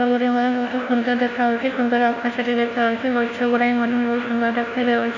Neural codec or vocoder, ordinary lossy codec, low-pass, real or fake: codec, 16 kHz, 1 kbps, FunCodec, trained on LibriTTS, 50 frames a second; none; 7.2 kHz; fake